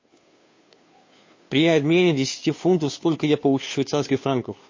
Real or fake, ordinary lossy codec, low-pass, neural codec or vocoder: fake; MP3, 32 kbps; 7.2 kHz; codec, 16 kHz, 2 kbps, FunCodec, trained on Chinese and English, 25 frames a second